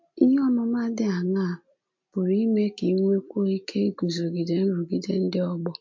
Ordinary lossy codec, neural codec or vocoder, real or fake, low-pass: MP3, 48 kbps; none; real; 7.2 kHz